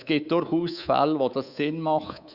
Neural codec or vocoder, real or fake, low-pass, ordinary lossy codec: codec, 24 kHz, 3.1 kbps, DualCodec; fake; 5.4 kHz; Opus, 64 kbps